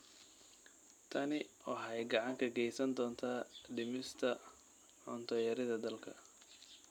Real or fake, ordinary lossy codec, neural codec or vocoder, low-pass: fake; none; vocoder, 44.1 kHz, 128 mel bands every 256 samples, BigVGAN v2; 19.8 kHz